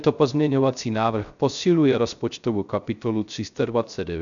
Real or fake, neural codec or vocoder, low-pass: fake; codec, 16 kHz, 0.3 kbps, FocalCodec; 7.2 kHz